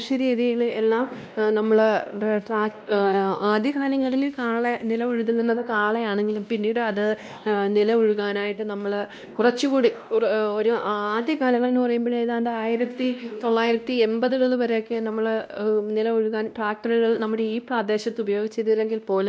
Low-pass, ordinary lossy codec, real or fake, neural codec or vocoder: none; none; fake; codec, 16 kHz, 1 kbps, X-Codec, WavLM features, trained on Multilingual LibriSpeech